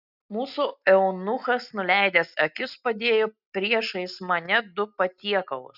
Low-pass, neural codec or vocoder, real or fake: 5.4 kHz; none; real